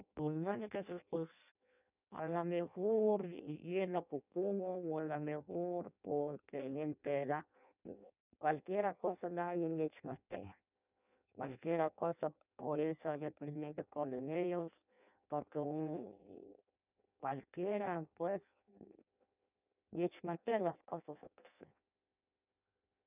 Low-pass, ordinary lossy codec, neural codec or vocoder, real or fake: 3.6 kHz; none; codec, 16 kHz in and 24 kHz out, 0.6 kbps, FireRedTTS-2 codec; fake